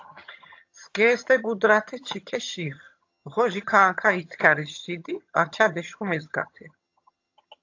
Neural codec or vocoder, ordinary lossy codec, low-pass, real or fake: vocoder, 22.05 kHz, 80 mel bands, HiFi-GAN; AAC, 48 kbps; 7.2 kHz; fake